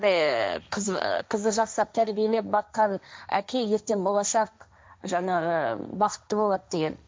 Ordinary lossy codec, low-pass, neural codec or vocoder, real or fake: none; none; codec, 16 kHz, 1.1 kbps, Voila-Tokenizer; fake